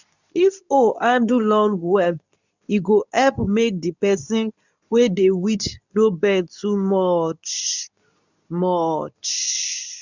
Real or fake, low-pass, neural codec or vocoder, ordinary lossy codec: fake; 7.2 kHz; codec, 24 kHz, 0.9 kbps, WavTokenizer, medium speech release version 2; none